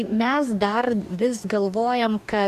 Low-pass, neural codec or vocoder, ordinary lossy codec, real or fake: 14.4 kHz; codec, 44.1 kHz, 2.6 kbps, DAC; AAC, 96 kbps; fake